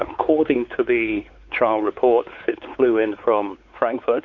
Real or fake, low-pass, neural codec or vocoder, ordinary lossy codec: fake; 7.2 kHz; codec, 16 kHz, 4 kbps, X-Codec, WavLM features, trained on Multilingual LibriSpeech; Opus, 64 kbps